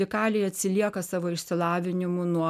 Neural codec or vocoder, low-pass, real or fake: none; 14.4 kHz; real